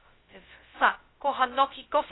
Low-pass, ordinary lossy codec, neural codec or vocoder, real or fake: 7.2 kHz; AAC, 16 kbps; codec, 16 kHz, 0.2 kbps, FocalCodec; fake